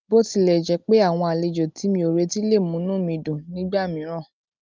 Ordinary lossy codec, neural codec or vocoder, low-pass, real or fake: Opus, 24 kbps; none; 7.2 kHz; real